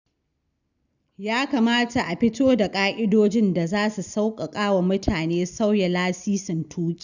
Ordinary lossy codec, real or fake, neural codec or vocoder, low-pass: none; real; none; 7.2 kHz